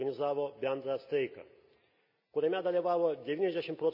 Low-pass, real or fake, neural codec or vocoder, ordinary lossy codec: 5.4 kHz; real; none; none